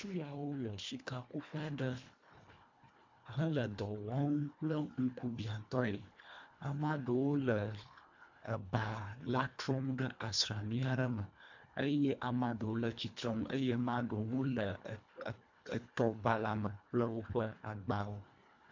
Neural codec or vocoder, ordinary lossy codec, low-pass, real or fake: codec, 24 kHz, 1.5 kbps, HILCodec; MP3, 64 kbps; 7.2 kHz; fake